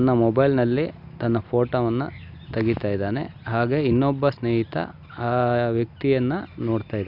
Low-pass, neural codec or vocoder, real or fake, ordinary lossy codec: 5.4 kHz; none; real; none